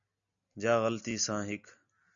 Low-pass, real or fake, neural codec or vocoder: 7.2 kHz; real; none